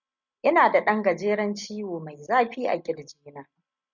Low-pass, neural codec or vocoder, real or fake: 7.2 kHz; none; real